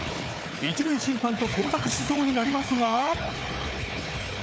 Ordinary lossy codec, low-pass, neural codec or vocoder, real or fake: none; none; codec, 16 kHz, 4 kbps, FunCodec, trained on Chinese and English, 50 frames a second; fake